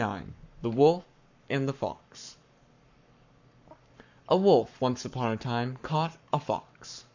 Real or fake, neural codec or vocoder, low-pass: fake; codec, 44.1 kHz, 7.8 kbps, Pupu-Codec; 7.2 kHz